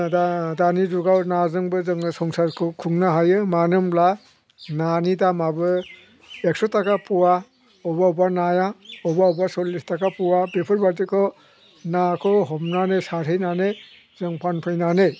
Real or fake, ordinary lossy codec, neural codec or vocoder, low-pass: real; none; none; none